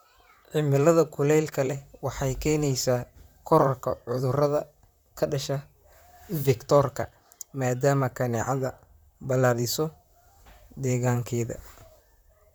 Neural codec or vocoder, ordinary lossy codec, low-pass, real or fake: vocoder, 44.1 kHz, 128 mel bands, Pupu-Vocoder; none; none; fake